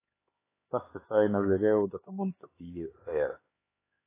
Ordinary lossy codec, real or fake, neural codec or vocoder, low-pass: AAC, 16 kbps; fake; codec, 16 kHz, 4 kbps, X-Codec, HuBERT features, trained on LibriSpeech; 3.6 kHz